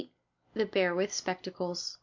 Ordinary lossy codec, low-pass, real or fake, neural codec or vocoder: MP3, 48 kbps; 7.2 kHz; real; none